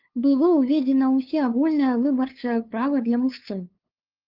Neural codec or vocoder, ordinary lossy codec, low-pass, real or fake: codec, 16 kHz, 2 kbps, FunCodec, trained on LibriTTS, 25 frames a second; Opus, 32 kbps; 5.4 kHz; fake